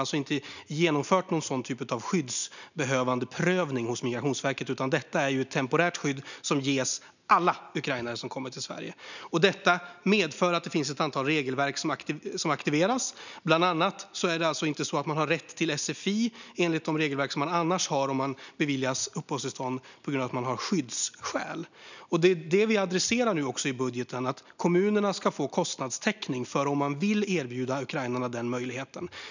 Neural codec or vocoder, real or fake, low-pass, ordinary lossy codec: none; real; 7.2 kHz; none